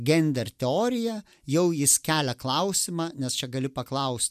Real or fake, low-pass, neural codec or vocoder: real; 14.4 kHz; none